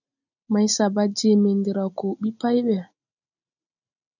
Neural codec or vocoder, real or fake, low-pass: none; real; 7.2 kHz